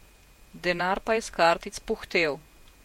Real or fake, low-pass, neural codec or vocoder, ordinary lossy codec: fake; 19.8 kHz; vocoder, 48 kHz, 128 mel bands, Vocos; MP3, 64 kbps